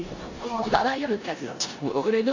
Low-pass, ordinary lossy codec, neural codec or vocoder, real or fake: 7.2 kHz; AAC, 48 kbps; codec, 16 kHz in and 24 kHz out, 0.9 kbps, LongCat-Audio-Codec, four codebook decoder; fake